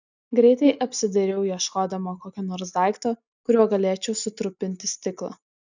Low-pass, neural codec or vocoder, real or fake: 7.2 kHz; none; real